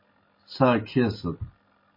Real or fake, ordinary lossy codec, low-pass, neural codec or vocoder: real; MP3, 24 kbps; 5.4 kHz; none